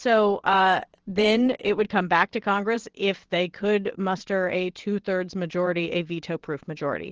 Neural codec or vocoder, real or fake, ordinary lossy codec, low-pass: vocoder, 22.05 kHz, 80 mel bands, WaveNeXt; fake; Opus, 16 kbps; 7.2 kHz